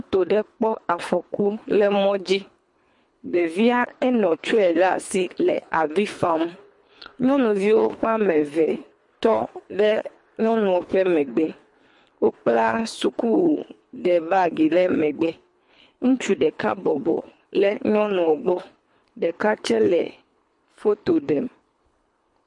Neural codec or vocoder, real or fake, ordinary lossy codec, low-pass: codec, 24 kHz, 3 kbps, HILCodec; fake; MP3, 48 kbps; 10.8 kHz